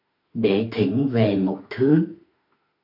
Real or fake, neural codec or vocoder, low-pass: fake; autoencoder, 48 kHz, 32 numbers a frame, DAC-VAE, trained on Japanese speech; 5.4 kHz